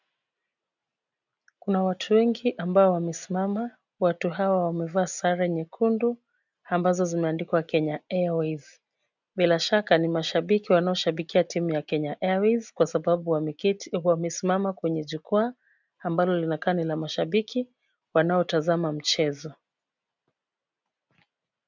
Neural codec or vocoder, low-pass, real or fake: none; 7.2 kHz; real